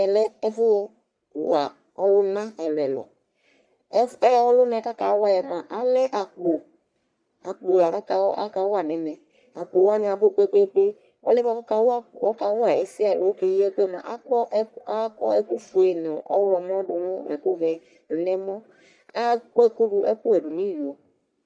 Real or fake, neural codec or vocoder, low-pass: fake; codec, 44.1 kHz, 1.7 kbps, Pupu-Codec; 9.9 kHz